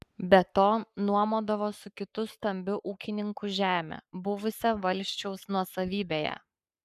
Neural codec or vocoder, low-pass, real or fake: codec, 44.1 kHz, 7.8 kbps, Pupu-Codec; 14.4 kHz; fake